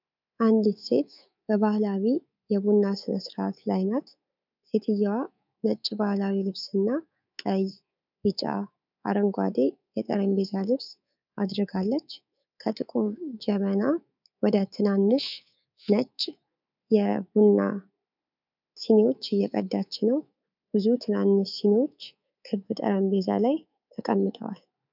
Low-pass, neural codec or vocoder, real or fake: 5.4 kHz; codec, 24 kHz, 3.1 kbps, DualCodec; fake